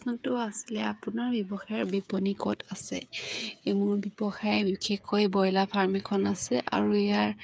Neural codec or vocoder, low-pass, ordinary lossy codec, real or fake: codec, 16 kHz, 8 kbps, FreqCodec, smaller model; none; none; fake